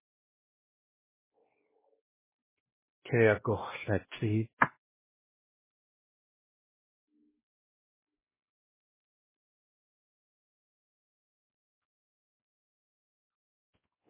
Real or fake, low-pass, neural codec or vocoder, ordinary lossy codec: fake; 3.6 kHz; codec, 16 kHz, 1 kbps, X-Codec, WavLM features, trained on Multilingual LibriSpeech; MP3, 16 kbps